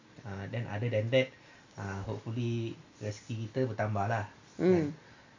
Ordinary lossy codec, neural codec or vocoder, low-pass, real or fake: AAC, 48 kbps; none; 7.2 kHz; real